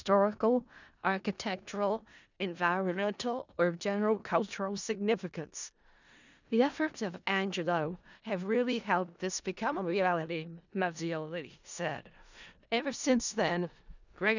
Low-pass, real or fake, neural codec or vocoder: 7.2 kHz; fake; codec, 16 kHz in and 24 kHz out, 0.4 kbps, LongCat-Audio-Codec, four codebook decoder